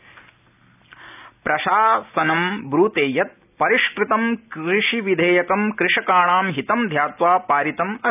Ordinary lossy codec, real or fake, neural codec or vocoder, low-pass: none; real; none; 3.6 kHz